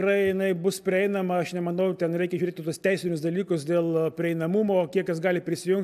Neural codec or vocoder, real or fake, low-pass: none; real; 14.4 kHz